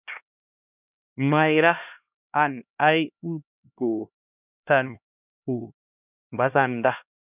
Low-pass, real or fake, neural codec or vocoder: 3.6 kHz; fake; codec, 16 kHz, 1 kbps, X-Codec, HuBERT features, trained on LibriSpeech